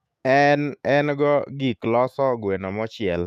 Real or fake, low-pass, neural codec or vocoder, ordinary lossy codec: fake; 14.4 kHz; autoencoder, 48 kHz, 128 numbers a frame, DAC-VAE, trained on Japanese speech; none